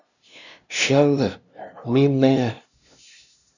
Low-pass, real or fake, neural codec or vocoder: 7.2 kHz; fake; codec, 16 kHz, 0.5 kbps, FunCodec, trained on LibriTTS, 25 frames a second